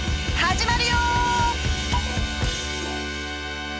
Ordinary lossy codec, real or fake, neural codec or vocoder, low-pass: none; real; none; none